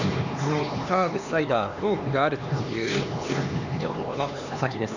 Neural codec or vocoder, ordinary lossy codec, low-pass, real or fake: codec, 16 kHz, 2 kbps, X-Codec, HuBERT features, trained on LibriSpeech; none; 7.2 kHz; fake